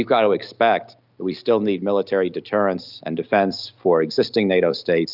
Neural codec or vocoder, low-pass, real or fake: none; 5.4 kHz; real